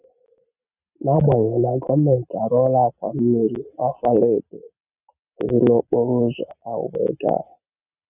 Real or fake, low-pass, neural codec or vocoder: real; 3.6 kHz; none